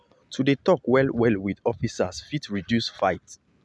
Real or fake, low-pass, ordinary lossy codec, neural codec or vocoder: real; none; none; none